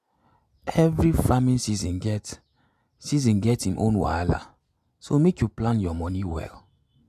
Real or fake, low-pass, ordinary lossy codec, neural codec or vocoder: real; 14.4 kHz; none; none